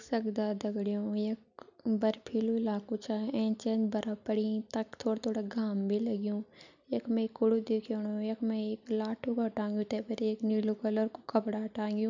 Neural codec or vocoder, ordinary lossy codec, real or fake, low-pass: none; none; real; 7.2 kHz